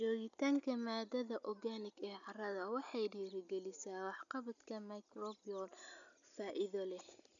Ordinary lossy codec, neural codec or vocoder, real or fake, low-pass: none; none; real; 7.2 kHz